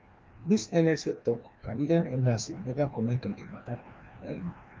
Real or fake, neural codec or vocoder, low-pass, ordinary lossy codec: fake; codec, 16 kHz, 1 kbps, FreqCodec, larger model; 7.2 kHz; Opus, 24 kbps